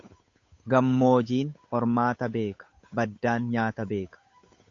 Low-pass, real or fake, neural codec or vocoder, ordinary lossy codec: 7.2 kHz; fake; codec, 16 kHz, 8 kbps, FunCodec, trained on Chinese and English, 25 frames a second; AAC, 48 kbps